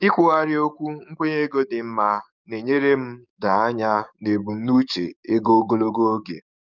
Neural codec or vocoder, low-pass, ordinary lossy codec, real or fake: codec, 44.1 kHz, 7.8 kbps, DAC; 7.2 kHz; none; fake